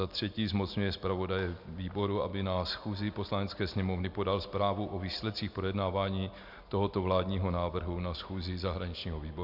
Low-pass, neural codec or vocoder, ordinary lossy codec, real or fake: 5.4 kHz; none; MP3, 48 kbps; real